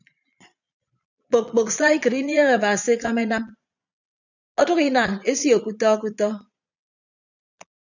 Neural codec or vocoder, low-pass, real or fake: vocoder, 22.05 kHz, 80 mel bands, Vocos; 7.2 kHz; fake